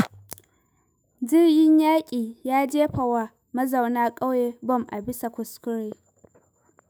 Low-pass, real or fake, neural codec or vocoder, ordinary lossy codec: none; fake; autoencoder, 48 kHz, 128 numbers a frame, DAC-VAE, trained on Japanese speech; none